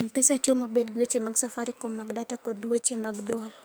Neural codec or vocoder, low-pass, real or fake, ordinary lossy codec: codec, 44.1 kHz, 2.6 kbps, SNAC; none; fake; none